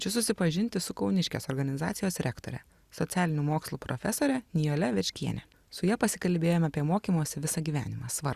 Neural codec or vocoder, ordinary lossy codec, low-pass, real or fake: none; Opus, 64 kbps; 14.4 kHz; real